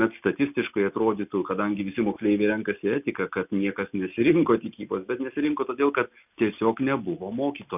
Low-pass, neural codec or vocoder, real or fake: 3.6 kHz; none; real